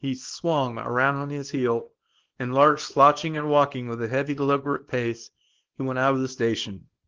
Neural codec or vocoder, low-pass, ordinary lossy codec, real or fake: codec, 24 kHz, 0.9 kbps, WavTokenizer, small release; 7.2 kHz; Opus, 16 kbps; fake